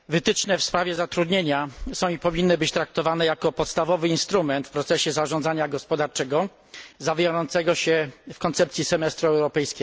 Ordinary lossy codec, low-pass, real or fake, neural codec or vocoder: none; none; real; none